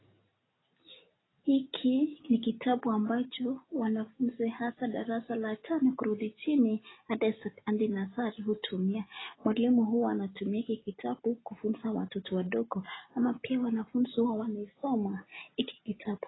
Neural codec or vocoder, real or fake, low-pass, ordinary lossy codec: none; real; 7.2 kHz; AAC, 16 kbps